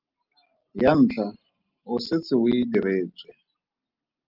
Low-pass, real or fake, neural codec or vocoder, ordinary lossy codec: 5.4 kHz; real; none; Opus, 24 kbps